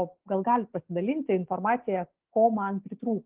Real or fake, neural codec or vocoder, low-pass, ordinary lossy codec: real; none; 3.6 kHz; Opus, 16 kbps